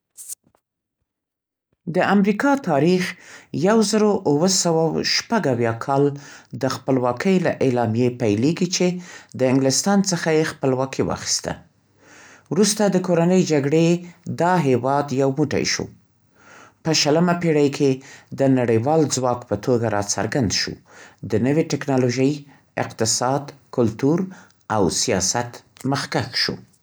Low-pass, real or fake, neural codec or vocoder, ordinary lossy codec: none; real; none; none